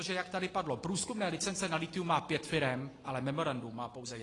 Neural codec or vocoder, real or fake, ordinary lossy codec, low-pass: none; real; AAC, 32 kbps; 10.8 kHz